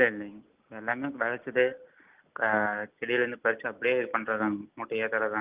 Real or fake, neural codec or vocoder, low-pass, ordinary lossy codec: real; none; 3.6 kHz; Opus, 32 kbps